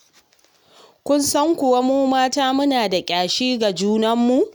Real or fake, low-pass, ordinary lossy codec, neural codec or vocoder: real; none; none; none